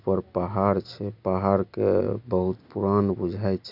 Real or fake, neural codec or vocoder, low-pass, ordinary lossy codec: real; none; 5.4 kHz; none